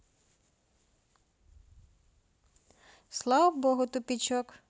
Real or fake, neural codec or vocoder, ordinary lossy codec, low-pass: real; none; none; none